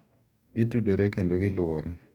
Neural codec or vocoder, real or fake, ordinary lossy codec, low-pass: codec, 44.1 kHz, 2.6 kbps, DAC; fake; none; 19.8 kHz